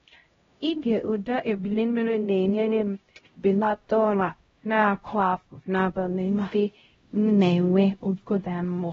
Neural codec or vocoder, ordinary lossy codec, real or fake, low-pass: codec, 16 kHz, 0.5 kbps, X-Codec, HuBERT features, trained on LibriSpeech; AAC, 24 kbps; fake; 7.2 kHz